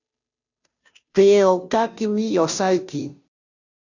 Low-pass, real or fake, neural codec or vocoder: 7.2 kHz; fake; codec, 16 kHz, 0.5 kbps, FunCodec, trained on Chinese and English, 25 frames a second